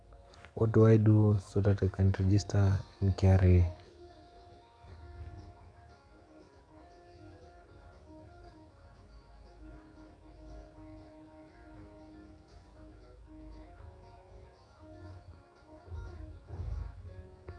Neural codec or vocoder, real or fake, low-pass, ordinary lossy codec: codec, 44.1 kHz, 7.8 kbps, DAC; fake; 9.9 kHz; none